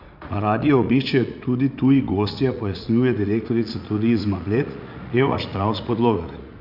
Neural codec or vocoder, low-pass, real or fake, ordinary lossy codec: vocoder, 44.1 kHz, 80 mel bands, Vocos; 5.4 kHz; fake; none